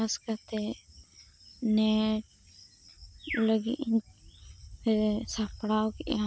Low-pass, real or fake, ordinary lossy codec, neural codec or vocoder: none; real; none; none